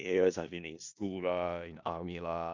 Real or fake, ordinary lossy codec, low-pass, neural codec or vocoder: fake; MP3, 64 kbps; 7.2 kHz; codec, 16 kHz in and 24 kHz out, 0.4 kbps, LongCat-Audio-Codec, four codebook decoder